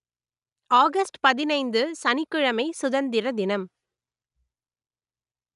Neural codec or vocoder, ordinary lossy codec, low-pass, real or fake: none; none; 10.8 kHz; real